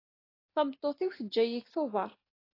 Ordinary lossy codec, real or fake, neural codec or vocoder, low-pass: AAC, 24 kbps; real; none; 5.4 kHz